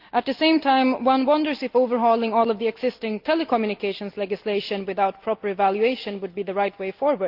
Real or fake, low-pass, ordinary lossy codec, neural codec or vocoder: real; 5.4 kHz; Opus, 24 kbps; none